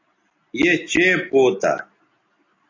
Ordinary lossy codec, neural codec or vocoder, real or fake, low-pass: AAC, 32 kbps; none; real; 7.2 kHz